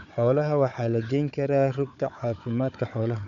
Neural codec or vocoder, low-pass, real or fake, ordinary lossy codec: codec, 16 kHz, 4 kbps, FunCodec, trained on Chinese and English, 50 frames a second; 7.2 kHz; fake; MP3, 96 kbps